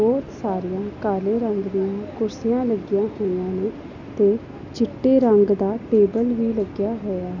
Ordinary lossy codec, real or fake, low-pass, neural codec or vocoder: none; real; 7.2 kHz; none